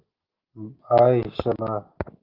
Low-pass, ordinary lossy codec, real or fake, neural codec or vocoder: 5.4 kHz; Opus, 16 kbps; real; none